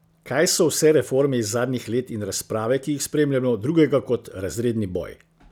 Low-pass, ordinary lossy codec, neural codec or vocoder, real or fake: none; none; none; real